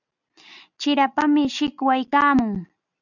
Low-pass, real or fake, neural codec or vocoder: 7.2 kHz; real; none